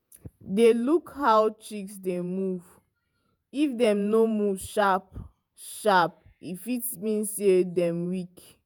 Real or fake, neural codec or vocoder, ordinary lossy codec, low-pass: fake; vocoder, 48 kHz, 128 mel bands, Vocos; none; none